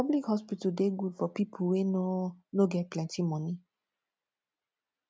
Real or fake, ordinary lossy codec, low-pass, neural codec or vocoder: real; none; none; none